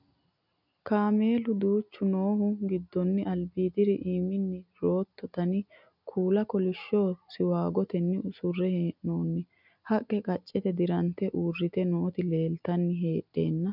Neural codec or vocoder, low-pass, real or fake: none; 5.4 kHz; real